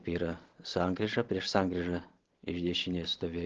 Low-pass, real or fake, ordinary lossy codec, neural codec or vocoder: 7.2 kHz; real; Opus, 16 kbps; none